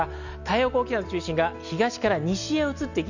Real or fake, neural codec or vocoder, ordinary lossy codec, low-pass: real; none; none; 7.2 kHz